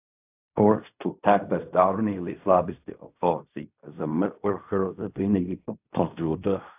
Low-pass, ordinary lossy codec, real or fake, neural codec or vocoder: 3.6 kHz; AAC, 32 kbps; fake; codec, 16 kHz in and 24 kHz out, 0.4 kbps, LongCat-Audio-Codec, fine tuned four codebook decoder